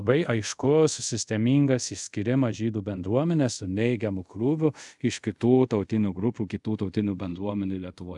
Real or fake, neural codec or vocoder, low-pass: fake; codec, 24 kHz, 0.5 kbps, DualCodec; 10.8 kHz